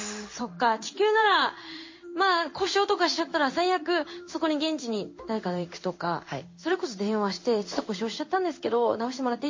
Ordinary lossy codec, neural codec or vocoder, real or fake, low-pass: MP3, 32 kbps; codec, 16 kHz in and 24 kHz out, 1 kbps, XY-Tokenizer; fake; 7.2 kHz